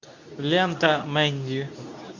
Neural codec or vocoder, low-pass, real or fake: codec, 24 kHz, 0.9 kbps, WavTokenizer, medium speech release version 2; 7.2 kHz; fake